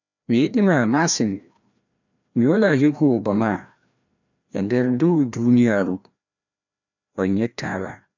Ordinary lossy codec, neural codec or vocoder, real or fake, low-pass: none; codec, 16 kHz, 1 kbps, FreqCodec, larger model; fake; 7.2 kHz